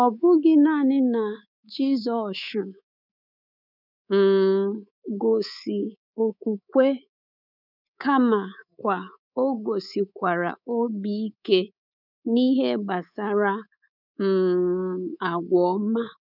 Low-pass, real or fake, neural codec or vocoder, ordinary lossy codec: 5.4 kHz; fake; codec, 24 kHz, 3.1 kbps, DualCodec; none